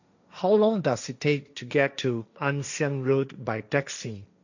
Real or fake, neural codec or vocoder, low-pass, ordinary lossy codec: fake; codec, 16 kHz, 1.1 kbps, Voila-Tokenizer; 7.2 kHz; none